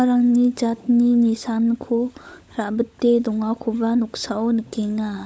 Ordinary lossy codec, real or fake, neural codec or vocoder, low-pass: none; fake; codec, 16 kHz, 16 kbps, FunCodec, trained on LibriTTS, 50 frames a second; none